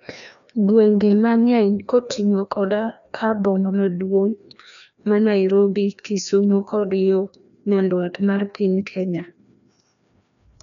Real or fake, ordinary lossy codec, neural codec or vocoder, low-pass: fake; none; codec, 16 kHz, 1 kbps, FreqCodec, larger model; 7.2 kHz